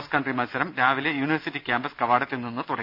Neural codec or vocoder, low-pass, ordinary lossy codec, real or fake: none; 5.4 kHz; none; real